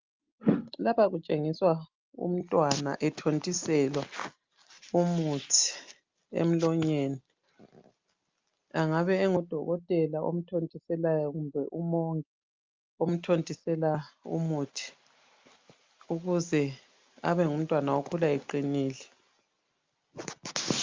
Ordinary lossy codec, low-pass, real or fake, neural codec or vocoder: Opus, 24 kbps; 7.2 kHz; real; none